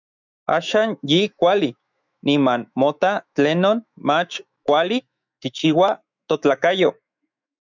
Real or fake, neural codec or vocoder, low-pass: fake; autoencoder, 48 kHz, 128 numbers a frame, DAC-VAE, trained on Japanese speech; 7.2 kHz